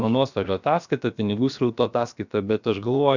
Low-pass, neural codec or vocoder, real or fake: 7.2 kHz; codec, 16 kHz, about 1 kbps, DyCAST, with the encoder's durations; fake